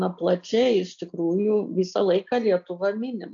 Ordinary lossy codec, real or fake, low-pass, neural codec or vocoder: AAC, 64 kbps; real; 7.2 kHz; none